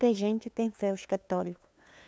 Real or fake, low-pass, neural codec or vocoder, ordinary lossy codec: fake; none; codec, 16 kHz, 2 kbps, FunCodec, trained on LibriTTS, 25 frames a second; none